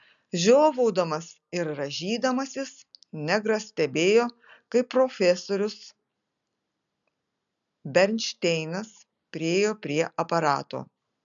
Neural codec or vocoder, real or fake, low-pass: none; real; 7.2 kHz